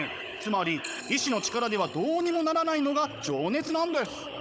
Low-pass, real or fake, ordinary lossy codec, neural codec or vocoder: none; fake; none; codec, 16 kHz, 16 kbps, FunCodec, trained on Chinese and English, 50 frames a second